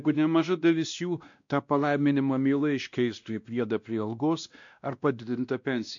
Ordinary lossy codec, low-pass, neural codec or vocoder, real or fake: MP3, 64 kbps; 7.2 kHz; codec, 16 kHz, 1 kbps, X-Codec, WavLM features, trained on Multilingual LibriSpeech; fake